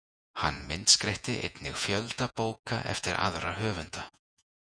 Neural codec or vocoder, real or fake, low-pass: vocoder, 48 kHz, 128 mel bands, Vocos; fake; 9.9 kHz